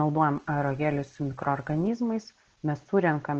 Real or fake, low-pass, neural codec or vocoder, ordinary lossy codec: real; 7.2 kHz; none; Opus, 16 kbps